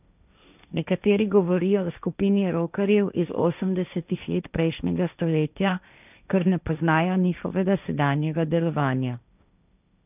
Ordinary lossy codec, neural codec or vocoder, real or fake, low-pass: none; codec, 16 kHz, 1.1 kbps, Voila-Tokenizer; fake; 3.6 kHz